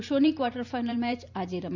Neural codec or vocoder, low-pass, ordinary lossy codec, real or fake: vocoder, 44.1 kHz, 128 mel bands every 512 samples, BigVGAN v2; 7.2 kHz; none; fake